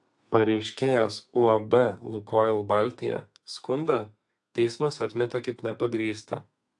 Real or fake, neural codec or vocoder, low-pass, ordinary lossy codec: fake; codec, 32 kHz, 1.9 kbps, SNAC; 10.8 kHz; AAC, 64 kbps